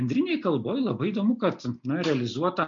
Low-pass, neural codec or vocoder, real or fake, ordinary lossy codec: 7.2 kHz; none; real; MP3, 48 kbps